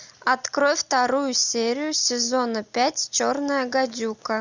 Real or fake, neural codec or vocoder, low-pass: real; none; 7.2 kHz